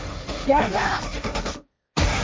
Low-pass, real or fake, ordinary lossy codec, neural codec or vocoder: none; fake; none; codec, 16 kHz, 1.1 kbps, Voila-Tokenizer